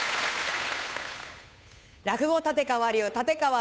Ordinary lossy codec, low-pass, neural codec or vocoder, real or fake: none; none; codec, 16 kHz, 8 kbps, FunCodec, trained on Chinese and English, 25 frames a second; fake